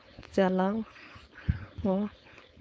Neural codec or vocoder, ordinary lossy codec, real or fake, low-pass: codec, 16 kHz, 4.8 kbps, FACodec; none; fake; none